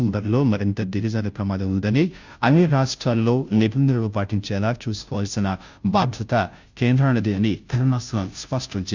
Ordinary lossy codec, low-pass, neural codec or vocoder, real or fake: Opus, 64 kbps; 7.2 kHz; codec, 16 kHz, 0.5 kbps, FunCodec, trained on Chinese and English, 25 frames a second; fake